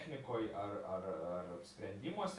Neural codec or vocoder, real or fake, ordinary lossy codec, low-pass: none; real; AAC, 64 kbps; 10.8 kHz